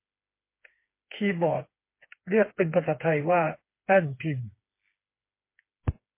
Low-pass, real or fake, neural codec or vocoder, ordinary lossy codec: 3.6 kHz; fake; codec, 16 kHz, 4 kbps, FreqCodec, smaller model; MP3, 24 kbps